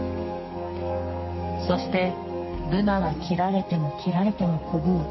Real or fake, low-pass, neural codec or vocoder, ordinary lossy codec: fake; 7.2 kHz; codec, 32 kHz, 1.9 kbps, SNAC; MP3, 24 kbps